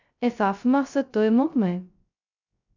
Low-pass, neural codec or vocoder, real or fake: 7.2 kHz; codec, 16 kHz, 0.2 kbps, FocalCodec; fake